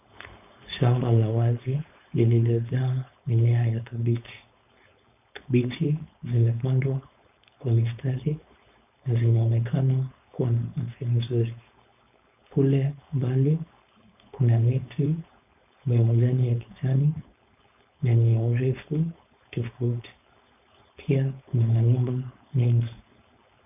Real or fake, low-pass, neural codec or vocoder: fake; 3.6 kHz; codec, 16 kHz, 4.8 kbps, FACodec